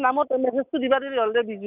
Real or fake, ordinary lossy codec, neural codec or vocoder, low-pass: real; none; none; 3.6 kHz